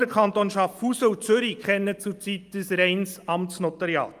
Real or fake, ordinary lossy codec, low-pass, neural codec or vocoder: real; Opus, 32 kbps; 14.4 kHz; none